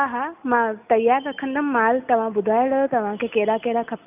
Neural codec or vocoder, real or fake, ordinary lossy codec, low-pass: none; real; none; 3.6 kHz